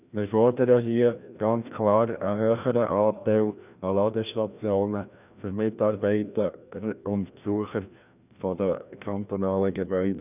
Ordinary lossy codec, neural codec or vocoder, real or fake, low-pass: none; codec, 16 kHz, 1 kbps, FreqCodec, larger model; fake; 3.6 kHz